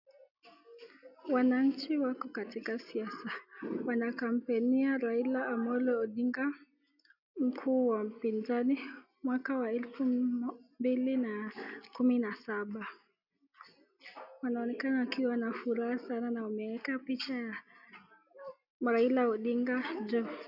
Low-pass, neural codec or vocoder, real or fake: 5.4 kHz; none; real